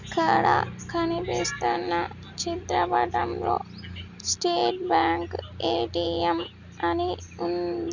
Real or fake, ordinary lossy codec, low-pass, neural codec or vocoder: real; none; 7.2 kHz; none